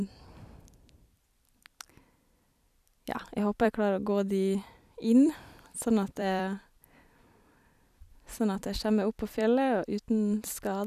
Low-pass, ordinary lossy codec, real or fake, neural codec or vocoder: 14.4 kHz; none; fake; vocoder, 44.1 kHz, 128 mel bands every 256 samples, BigVGAN v2